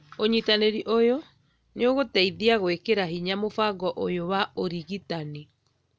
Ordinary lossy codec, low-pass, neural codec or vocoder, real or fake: none; none; none; real